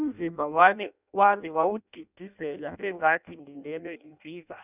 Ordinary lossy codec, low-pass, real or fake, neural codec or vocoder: none; 3.6 kHz; fake; codec, 16 kHz in and 24 kHz out, 0.6 kbps, FireRedTTS-2 codec